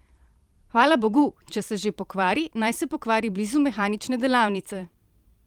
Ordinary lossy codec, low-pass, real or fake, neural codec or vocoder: Opus, 24 kbps; 19.8 kHz; fake; vocoder, 44.1 kHz, 128 mel bands every 512 samples, BigVGAN v2